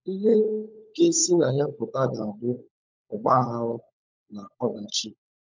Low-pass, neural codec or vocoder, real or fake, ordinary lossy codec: 7.2 kHz; codec, 16 kHz, 16 kbps, FunCodec, trained on LibriTTS, 50 frames a second; fake; MP3, 64 kbps